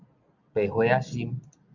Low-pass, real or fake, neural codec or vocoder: 7.2 kHz; real; none